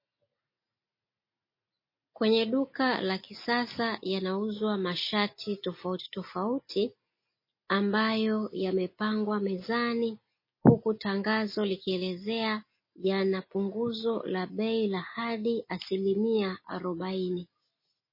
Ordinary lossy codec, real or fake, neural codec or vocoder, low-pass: MP3, 24 kbps; real; none; 5.4 kHz